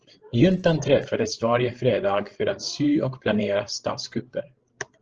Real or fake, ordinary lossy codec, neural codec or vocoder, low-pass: fake; Opus, 16 kbps; codec, 16 kHz, 16 kbps, FreqCodec, larger model; 7.2 kHz